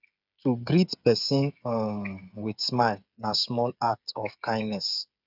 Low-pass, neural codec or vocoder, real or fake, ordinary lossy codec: 5.4 kHz; codec, 16 kHz, 8 kbps, FreqCodec, smaller model; fake; none